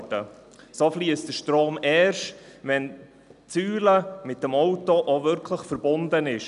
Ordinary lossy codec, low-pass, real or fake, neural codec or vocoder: none; 10.8 kHz; real; none